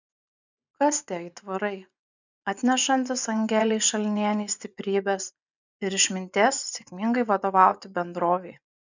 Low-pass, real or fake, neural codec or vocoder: 7.2 kHz; fake; vocoder, 24 kHz, 100 mel bands, Vocos